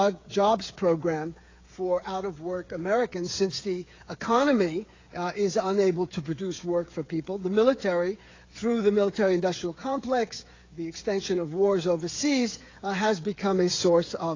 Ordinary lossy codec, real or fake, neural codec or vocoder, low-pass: AAC, 32 kbps; fake; codec, 16 kHz, 8 kbps, FreqCodec, smaller model; 7.2 kHz